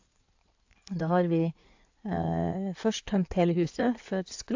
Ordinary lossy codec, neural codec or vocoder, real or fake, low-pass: AAC, 48 kbps; codec, 16 kHz in and 24 kHz out, 2.2 kbps, FireRedTTS-2 codec; fake; 7.2 kHz